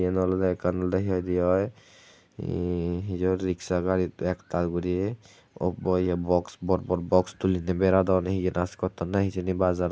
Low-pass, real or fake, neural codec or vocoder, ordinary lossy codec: none; real; none; none